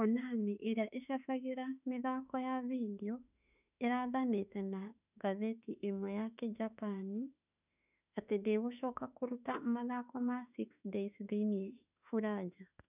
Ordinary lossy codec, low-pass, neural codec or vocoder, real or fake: none; 3.6 kHz; autoencoder, 48 kHz, 32 numbers a frame, DAC-VAE, trained on Japanese speech; fake